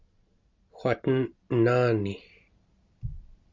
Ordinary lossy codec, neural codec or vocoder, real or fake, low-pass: Opus, 64 kbps; vocoder, 44.1 kHz, 128 mel bands every 256 samples, BigVGAN v2; fake; 7.2 kHz